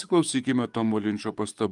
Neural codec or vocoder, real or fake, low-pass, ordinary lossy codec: codec, 44.1 kHz, 7.8 kbps, DAC; fake; 10.8 kHz; Opus, 32 kbps